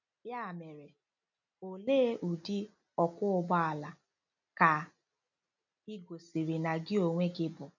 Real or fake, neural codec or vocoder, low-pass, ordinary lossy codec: real; none; 7.2 kHz; none